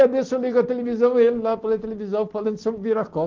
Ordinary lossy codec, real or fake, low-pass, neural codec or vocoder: Opus, 16 kbps; real; 7.2 kHz; none